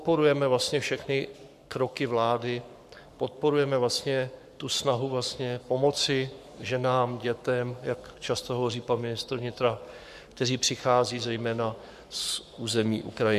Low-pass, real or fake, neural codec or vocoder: 14.4 kHz; fake; codec, 44.1 kHz, 7.8 kbps, Pupu-Codec